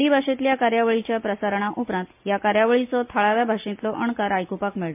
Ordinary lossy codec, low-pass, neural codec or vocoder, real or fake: MP3, 32 kbps; 3.6 kHz; none; real